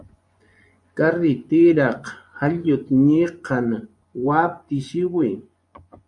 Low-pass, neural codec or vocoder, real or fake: 10.8 kHz; vocoder, 24 kHz, 100 mel bands, Vocos; fake